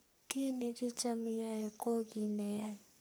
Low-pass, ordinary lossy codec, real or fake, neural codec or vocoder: none; none; fake; codec, 44.1 kHz, 3.4 kbps, Pupu-Codec